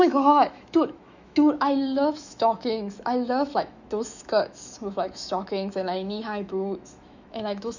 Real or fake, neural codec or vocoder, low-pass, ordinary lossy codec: fake; autoencoder, 48 kHz, 128 numbers a frame, DAC-VAE, trained on Japanese speech; 7.2 kHz; none